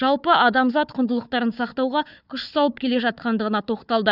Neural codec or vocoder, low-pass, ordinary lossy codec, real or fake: codec, 16 kHz, 4 kbps, FunCodec, trained on Chinese and English, 50 frames a second; 5.4 kHz; none; fake